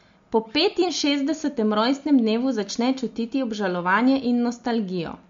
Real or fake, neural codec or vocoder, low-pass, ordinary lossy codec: real; none; 7.2 kHz; MP3, 48 kbps